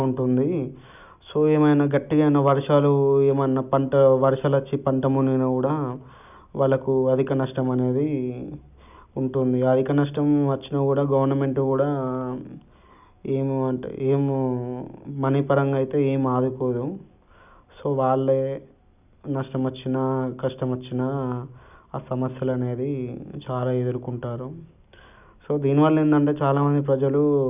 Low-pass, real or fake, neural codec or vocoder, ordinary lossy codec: 3.6 kHz; real; none; none